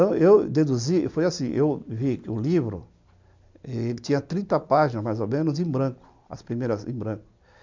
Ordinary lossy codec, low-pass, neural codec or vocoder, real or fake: MP3, 64 kbps; 7.2 kHz; none; real